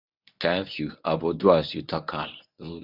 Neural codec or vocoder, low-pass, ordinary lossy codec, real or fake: codec, 24 kHz, 0.9 kbps, WavTokenizer, medium speech release version 1; 5.4 kHz; Opus, 64 kbps; fake